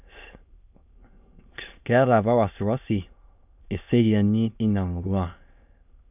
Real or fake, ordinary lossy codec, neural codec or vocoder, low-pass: fake; none; autoencoder, 22.05 kHz, a latent of 192 numbers a frame, VITS, trained on many speakers; 3.6 kHz